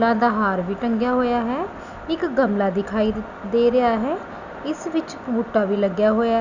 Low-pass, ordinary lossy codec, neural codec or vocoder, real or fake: 7.2 kHz; none; none; real